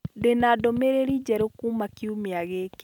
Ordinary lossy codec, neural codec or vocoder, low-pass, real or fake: none; none; 19.8 kHz; real